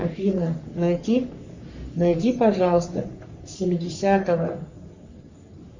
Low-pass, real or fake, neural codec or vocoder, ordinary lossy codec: 7.2 kHz; fake; codec, 44.1 kHz, 3.4 kbps, Pupu-Codec; Opus, 64 kbps